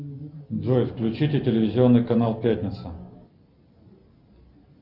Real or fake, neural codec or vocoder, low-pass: real; none; 5.4 kHz